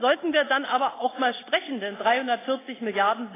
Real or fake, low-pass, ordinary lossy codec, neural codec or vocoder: real; 3.6 kHz; AAC, 16 kbps; none